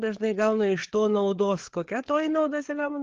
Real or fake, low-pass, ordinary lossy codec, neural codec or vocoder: fake; 7.2 kHz; Opus, 16 kbps; codec, 16 kHz, 4 kbps, FreqCodec, larger model